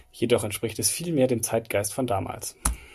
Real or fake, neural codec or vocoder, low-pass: real; none; 14.4 kHz